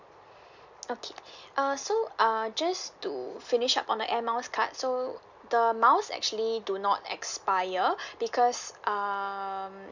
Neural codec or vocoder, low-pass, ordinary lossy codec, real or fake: none; 7.2 kHz; none; real